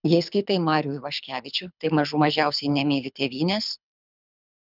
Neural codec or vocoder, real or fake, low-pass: codec, 24 kHz, 6 kbps, HILCodec; fake; 5.4 kHz